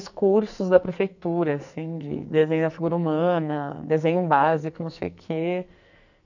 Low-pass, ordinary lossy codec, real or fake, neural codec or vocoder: 7.2 kHz; none; fake; codec, 44.1 kHz, 2.6 kbps, SNAC